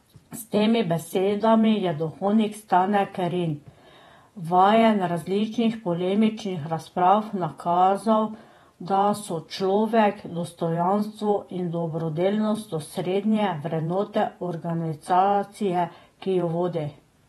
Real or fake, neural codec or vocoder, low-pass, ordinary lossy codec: fake; vocoder, 48 kHz, 128 mel bands, Vocos; 19.8 kHz; AAC, 32 kbps